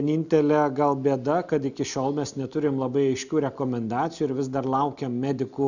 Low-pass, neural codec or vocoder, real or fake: 7.2 kHz; none; real